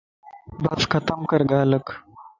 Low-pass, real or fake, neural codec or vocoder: 7.2 kHz; real; none